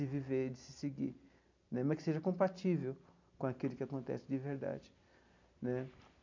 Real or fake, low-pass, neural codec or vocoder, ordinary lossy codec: real; 7.2 kHz; none; MP3, 64 kbps